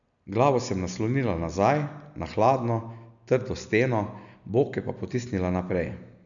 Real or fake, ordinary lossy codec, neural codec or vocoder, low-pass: real; none; none; 7.2 kHz